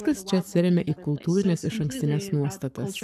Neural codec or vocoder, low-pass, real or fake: codec, 44.1 kHz, 7.8 kbps, Pupu-Codec; 14.4 kHz; fake